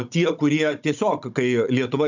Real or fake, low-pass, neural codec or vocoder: fake; 7.2 kHz; codec, 16 kHz, 16 kbps, FunCodec, trained on Chinese and English, 50 frames a second